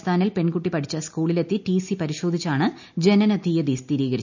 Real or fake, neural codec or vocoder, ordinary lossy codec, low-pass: real; none; none; 7.2 kHz